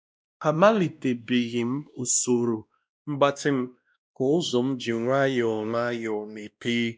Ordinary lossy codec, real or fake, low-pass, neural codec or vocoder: none; fake; none; codec, 16 kHz, 1 kbps, X-Codec, WavLM features, trained on Multilingual LibriSpeech